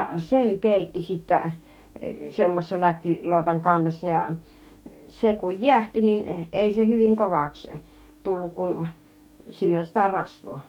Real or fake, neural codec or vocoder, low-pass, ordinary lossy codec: fake; codec, 44.1 kHz, 2.6 kbps, DAC; 19.8 kHz; none